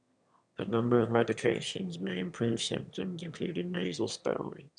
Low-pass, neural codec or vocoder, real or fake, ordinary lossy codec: 9.9 kHz; autoencoder, 22.05 kHz, a latent of 192 numbers a frame, VITS, trained on one speaker; fake; none